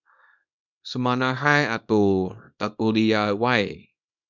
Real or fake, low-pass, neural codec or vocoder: fake; 7.2 kHz; codec, 24 kHz, 0.9 kbps, WavTokenizer, small release